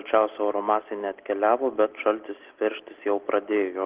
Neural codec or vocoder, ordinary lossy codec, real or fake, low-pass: none; Opus, 16 kbps; real; 3.6 kHz